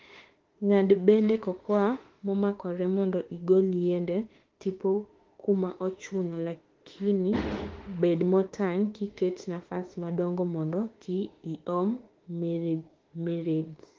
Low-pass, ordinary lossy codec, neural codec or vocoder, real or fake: 7.2 kHz; Opus, 16 kbps; autoencoder, 48 kHz, 32 numbers a frame, DAC-VAE, trained on Japanese speech; fake